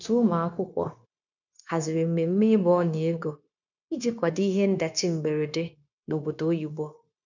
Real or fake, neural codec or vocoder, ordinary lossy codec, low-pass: fake; codec, 16 kHz, 0.9 kbps, LongCat-Audio-Codec; none; 7.2 kHz